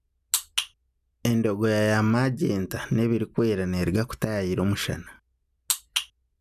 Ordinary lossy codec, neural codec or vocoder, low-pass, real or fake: none; none; 14.4 kHz; real